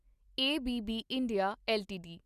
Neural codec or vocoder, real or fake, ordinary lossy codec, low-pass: none; real; Opus, 64 kbps; 14.4 kHz